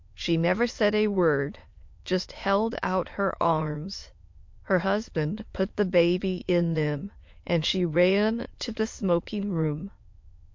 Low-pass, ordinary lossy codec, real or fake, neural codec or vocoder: 7.2 kHz; MP3, 48 kbps; fake; autoencoder, 22.05 kHz, a latent of 192 numbers a frame, VITS, trained on many speakers